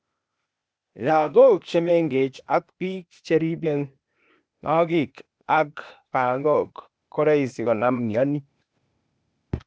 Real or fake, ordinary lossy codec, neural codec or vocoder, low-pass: fake; none; codec, 16 kHz, 0.8 kbps, ZipCodec; none